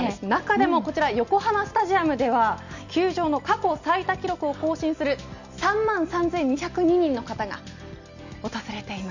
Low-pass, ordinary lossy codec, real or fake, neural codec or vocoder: 7.2 kHz; none; real; none